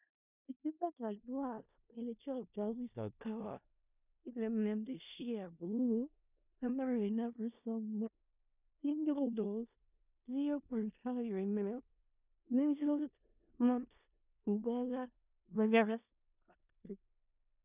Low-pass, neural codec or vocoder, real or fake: 3.6 kHz; codec, 16 kHz in and 24 kHz out, 0.4 kbps, LongCat-Audio-Codec, four codebook decoder; fake